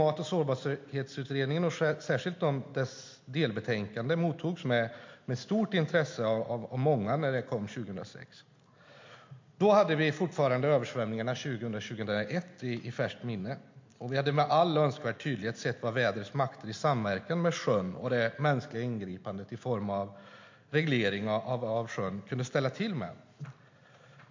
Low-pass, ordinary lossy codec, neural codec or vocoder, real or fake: 7.2 kHz; MP3, 48 kbps; none; real